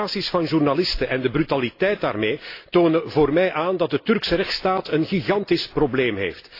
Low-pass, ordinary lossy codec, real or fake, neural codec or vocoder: 5.4 kHz; AAC, 32 kbps; real; none